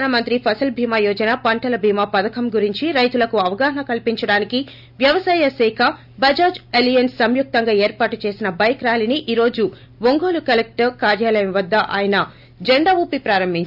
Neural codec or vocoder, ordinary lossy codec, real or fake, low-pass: none; none; real; 5.4 kHz